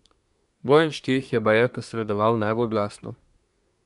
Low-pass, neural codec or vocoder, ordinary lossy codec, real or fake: 10.8 kHz; codec, 24 kHz, 1 kbps, SNAC; none; fake